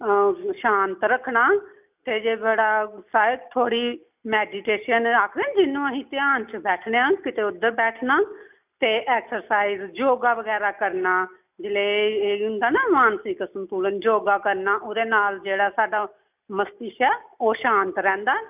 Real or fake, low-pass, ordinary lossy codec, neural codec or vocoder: real; 3.6 kHz; none; none